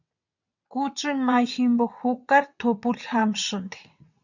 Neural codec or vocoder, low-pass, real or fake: vocoder, 22.05 kHz, 80 mel bands, WaveNeXt; 7.2 kHz; fake